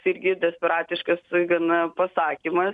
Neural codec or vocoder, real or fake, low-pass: none; real; 10.8 kHz